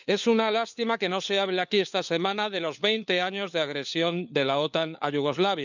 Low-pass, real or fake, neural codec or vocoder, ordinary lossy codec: 7.2 kHz; fake; codec, 16 kHz, 4 kbps, FunCodec, trained on LibriTTS, 50 frames a second; none